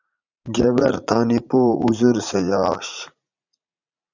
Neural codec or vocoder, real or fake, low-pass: vocoder, 44.1 kHz, 80 mel bands, Vocos; fake; 7.2 kHz